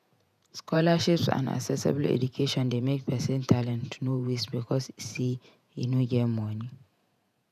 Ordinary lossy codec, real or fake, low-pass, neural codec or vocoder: none; fake; 14.4 kHz; vocoder, 48 kHz, 128 mel bands, Vocos